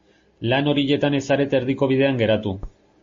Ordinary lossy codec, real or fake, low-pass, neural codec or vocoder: MP3, 32 kbps; real; 7.2 kHz; none